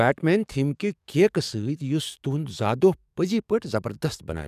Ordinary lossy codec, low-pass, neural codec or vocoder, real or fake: none; 14.4 kHz; codec, 44.1 kHz, 7.8 kbps, DAC; fake